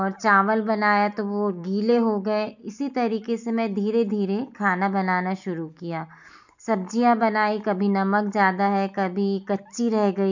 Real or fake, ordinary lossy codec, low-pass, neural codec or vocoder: real; none; 7.2 kHz; none